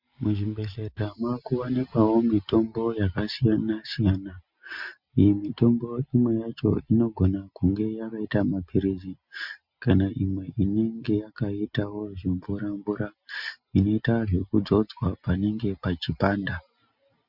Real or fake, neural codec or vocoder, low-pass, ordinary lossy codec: real; none; 5.4 kHz; MP3, 48 kbps